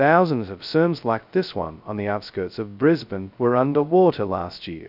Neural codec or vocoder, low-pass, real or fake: codec, 16 kHz, 0.2 kbps, FocalCodec; 5.4 kHz; fake